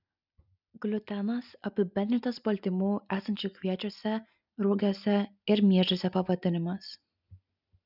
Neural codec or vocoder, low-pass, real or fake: none; 5.4 kHz; real